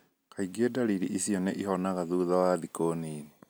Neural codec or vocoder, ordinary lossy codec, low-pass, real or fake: none; none; none; real